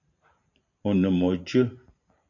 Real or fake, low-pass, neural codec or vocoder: fake; 7.2 kHz; vocoder, 44.1 kHz, 128 mel bands every 512 samples, BigVGAN v2